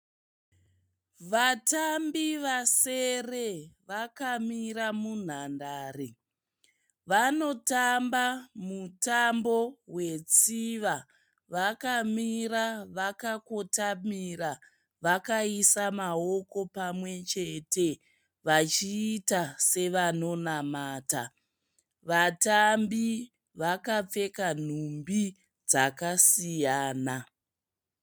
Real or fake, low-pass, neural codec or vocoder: real; 19.8 kHz; none